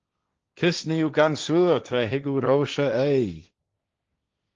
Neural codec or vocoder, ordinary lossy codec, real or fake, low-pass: codec, 16 kHz, 1.1 kbps, Voila-Tokenizer; Opus, 24 kbps; fake; 7.2 kHz